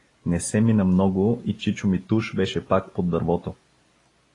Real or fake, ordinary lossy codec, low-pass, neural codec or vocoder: real; AAC, 32 kbps; 10.8 kHz; none